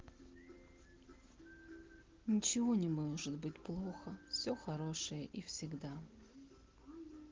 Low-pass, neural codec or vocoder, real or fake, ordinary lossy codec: 7.2 kHz; none; real; Opus, 16 kbps